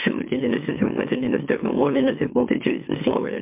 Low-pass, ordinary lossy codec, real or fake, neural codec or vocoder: 3.6 kHz; MP3, 32 kbps; fake; autoencoder, 44.1 kHz, a latent of 192 numbers a frame, MeloTTS